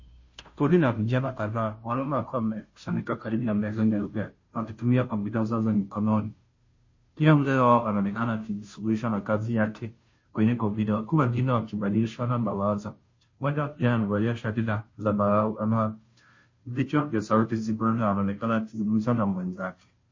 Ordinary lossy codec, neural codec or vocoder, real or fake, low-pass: MP3, 32 kbps; codec, 16 kHz, 0.5 kbps, FunCodec, trained on Chinese and English, 25 frames a second; fake; 7.2 kHz